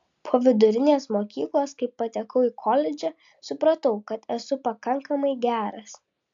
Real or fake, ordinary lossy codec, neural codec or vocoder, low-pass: real; AAC, 64 kbps; none; 7.2 kHz